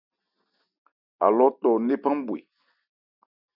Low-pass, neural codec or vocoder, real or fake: 5.4 kHz; none; real